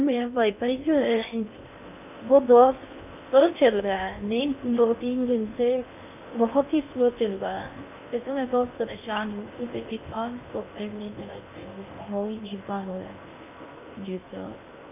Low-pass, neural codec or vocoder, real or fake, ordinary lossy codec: 3.6 kHz; codec, 16 kHz in and 24 kHz out, 0.6 kbps, FocalCodec, streaming, 2048 codes; fake; none